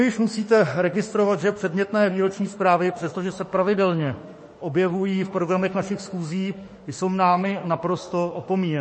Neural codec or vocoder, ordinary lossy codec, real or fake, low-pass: autoencoder, 48 kHz, 32 numbers a frame, DAC-VAE, trained on Japanese speech; MP3, 32 kbps; fake; 9.9 kHz